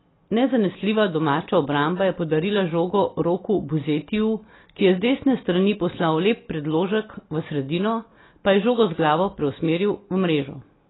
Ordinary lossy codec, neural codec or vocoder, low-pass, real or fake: AAC, 16 kbps; none; 7.2 kHz; real